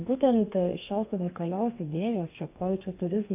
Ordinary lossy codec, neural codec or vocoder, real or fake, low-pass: AAC, 32 kbps; codec, 32 kHz, 1.9 kbps, SNAC; fake; 3.6 kHz